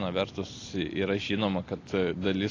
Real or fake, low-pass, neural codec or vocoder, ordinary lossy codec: real; 7.2 kHz; none; AAC, 32 kbps